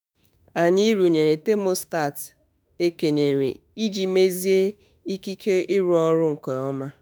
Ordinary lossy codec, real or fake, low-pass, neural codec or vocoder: none; fake; none; autoencoder, 48 kHz, 32 numbers a frame, DAC-VAE, trained on Japanese speech